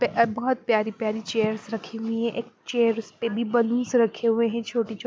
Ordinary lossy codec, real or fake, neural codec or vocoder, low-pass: none; real; none; none